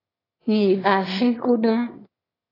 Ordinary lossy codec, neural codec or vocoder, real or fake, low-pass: AAC, 24 kbps; autoencoder, 22.05 kHz, a latent of 192 numbers a frame, VITS, trained on one speaker; fake; 5.4 kHz